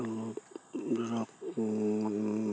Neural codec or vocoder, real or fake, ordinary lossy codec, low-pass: none; real; none; none